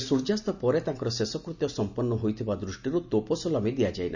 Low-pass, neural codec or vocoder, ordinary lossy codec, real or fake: 7.2 kHz; none; none; real